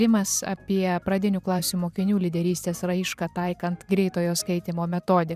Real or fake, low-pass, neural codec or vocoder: fake; 14.4 kHz; vocoder, 44.1 kHz, 128 mel bands every 512 samples, BigVGAN v2